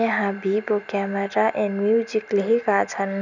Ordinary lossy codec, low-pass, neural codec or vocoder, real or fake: none; 7.2 kHz; none; real